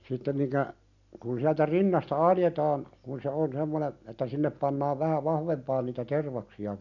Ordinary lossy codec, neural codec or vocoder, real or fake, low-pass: none; none; real; 7.2 kHz